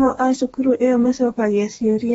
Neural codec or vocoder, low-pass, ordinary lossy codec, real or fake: codec, 32 kHz, 1.9 kbps, SNAC; 14.4 kHz; AAC, 24 kbps; fake